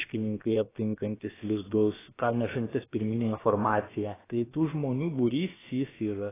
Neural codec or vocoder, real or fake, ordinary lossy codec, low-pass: codec, 16 kHz, about 1 kbps, DyCAST, with the encoder's durations; fake; AAC, 16 kbps; 3.6 kHz